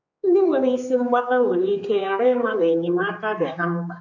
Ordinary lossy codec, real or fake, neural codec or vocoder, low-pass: AAC, 48 kbps; fake; codec, 16 kHz, 4 kbps, X-Codec, HuBERT features, trained on general audio; 7.2 kHz